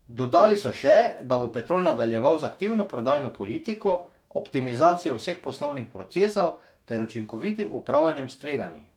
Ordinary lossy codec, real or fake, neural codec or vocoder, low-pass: none; fake; codec, 44.1 kHz, 2.6 kbps, DAC; 19.8 kHz